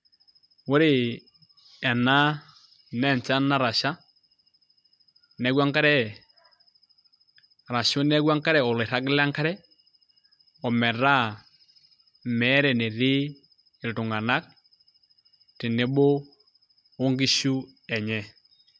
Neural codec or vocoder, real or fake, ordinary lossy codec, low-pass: none; real; none; none